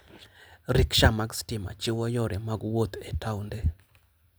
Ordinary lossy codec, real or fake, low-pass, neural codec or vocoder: none; real; none; none